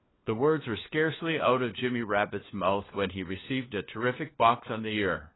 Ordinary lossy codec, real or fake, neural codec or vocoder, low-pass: AAC, 16 kbps; fake; codec, 16 kHz, 4 kbps, FunCodec, trained on LibriTTS, 50 frames a second; 7.2 kHz